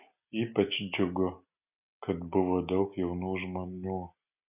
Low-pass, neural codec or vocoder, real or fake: 3.6 kHz; none; real